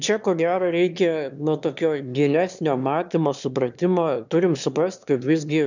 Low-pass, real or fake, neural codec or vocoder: 7.2 kHz; fake; autoencoder, 22.05 kHz, a latent of 192 numbers a frame, VITS, trained on one speaker